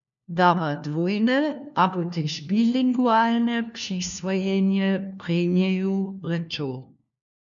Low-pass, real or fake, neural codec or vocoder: 7.2 kHz; fake; codec, 16 kHz, 1 kbps, FunCodec, trained on LibriTTS, 50 frames a second